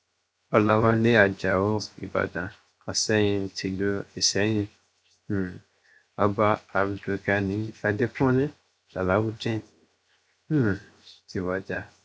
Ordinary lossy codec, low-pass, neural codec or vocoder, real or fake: none; none; codec, 16 kHz, 0.7 kbps, FocalCodec; fake